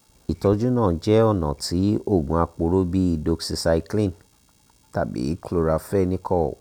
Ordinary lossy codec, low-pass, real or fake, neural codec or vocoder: none; 19.8 kHz; real; none